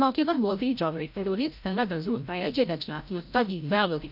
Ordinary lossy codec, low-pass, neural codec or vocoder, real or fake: none; 5.4 kHz; codec, 16 kHz, 0.5 kbps, FreqCodec, larger model; fake